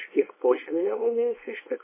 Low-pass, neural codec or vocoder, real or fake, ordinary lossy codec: 3.6 kHz; codec, 24 kHz, 0.9 kbps, WavTokenizer, small release; fake; MP3, 16 kbps